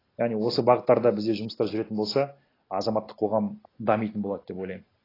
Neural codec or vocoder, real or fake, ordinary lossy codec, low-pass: none; real; AAC, 24 kbps; 5.4 kHz